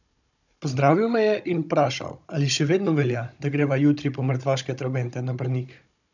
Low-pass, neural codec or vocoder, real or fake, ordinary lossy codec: 7.2 kHz; codec, 16 kHz, 16 kbps, FunCodec, trained on Chinese and English, 50 frames a second; fake; none